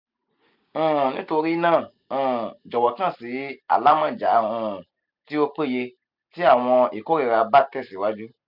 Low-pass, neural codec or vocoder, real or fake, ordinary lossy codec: 5.4 kHz; none; real; none